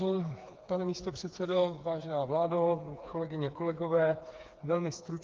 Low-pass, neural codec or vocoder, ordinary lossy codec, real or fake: 7.2 kHz; codec, 16 kHz, 4 kbps, FreqCodec, smaller model; Opus, 24 kbps; fake